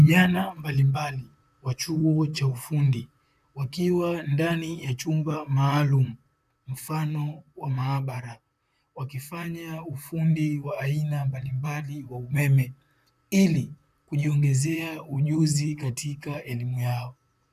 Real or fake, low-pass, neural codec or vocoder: fake; 14.4 kHz; vocoder, 44.1 kHz, 128 mel bands, Pupu-Vocoder